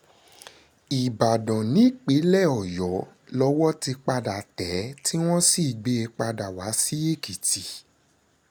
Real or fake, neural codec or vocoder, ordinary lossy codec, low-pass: real; none; none; none